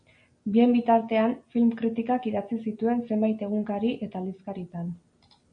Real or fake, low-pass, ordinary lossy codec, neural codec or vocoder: real; 9.9 kHz; MP3, 48 kbps; none